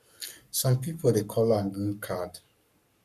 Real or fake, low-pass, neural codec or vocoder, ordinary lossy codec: fake; 14.4 kHz; codec, 44.1 kHz, 7.8 kbps, Pupu-Codec; none